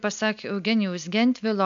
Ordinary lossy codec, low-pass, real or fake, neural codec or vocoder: MP3, 64 kbps; 7.2 kHz; real; none